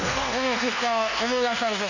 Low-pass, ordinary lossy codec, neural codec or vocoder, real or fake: 7.2 kHz; none; codec, 24 kHz, 1.2 kbps, DualCodec; fake